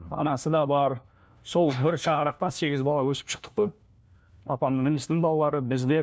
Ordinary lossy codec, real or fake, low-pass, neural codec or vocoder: none; fake; none; codec, 16 kHz, 1 kbps, FunCodec, trained on LibriTTS, 50 frames a second